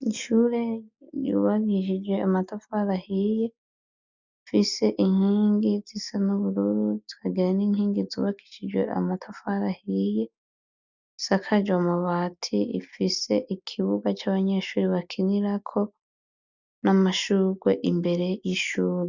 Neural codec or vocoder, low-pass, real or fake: none; 7.2 kHz; real